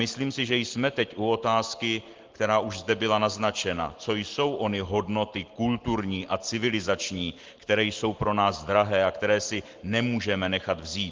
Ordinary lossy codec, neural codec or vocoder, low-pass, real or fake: Opus, 16 kbps; none; 7.2 kHz; real